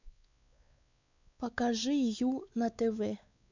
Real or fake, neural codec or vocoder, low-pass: fake; codec, 16 kHz, 4 kbps, X-Codec, WavLM features, trained on Multilingual LibriSpeech; 7.2 kHz